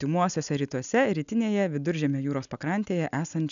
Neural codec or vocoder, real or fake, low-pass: none; real; 7.2 kHz